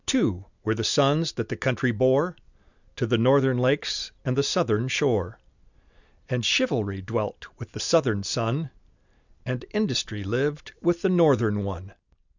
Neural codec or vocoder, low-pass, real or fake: none; 7.2 kHz; real